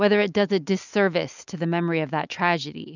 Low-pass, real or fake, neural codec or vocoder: 7.2 kHz; real; none